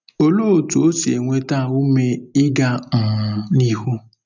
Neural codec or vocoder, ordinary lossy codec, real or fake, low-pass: none; none; real; 7.2 kHz